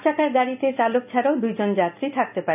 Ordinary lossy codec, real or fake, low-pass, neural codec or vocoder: none; real; 3.6 kHz; none